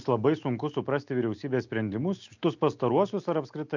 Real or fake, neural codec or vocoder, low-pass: real; none; 7.2 kHz